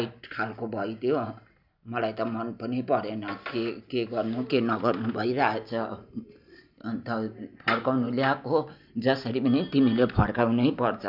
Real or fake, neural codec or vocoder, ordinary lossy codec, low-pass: real; none; none; 5.4 kHz